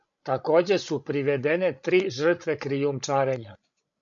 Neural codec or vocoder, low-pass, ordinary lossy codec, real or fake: codec, 16 kHz, 16 kbps, FreqCodec, larger model; 7.2 kHz; MP3, 64 kbps; fake